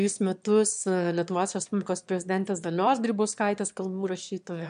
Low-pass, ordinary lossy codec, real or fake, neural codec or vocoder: 9.9 kHz; MP3, 64 kbps; fake; autoencoder, 22.05 kHz, a latent of 192 numbers a frame, VITS, trained on one speaker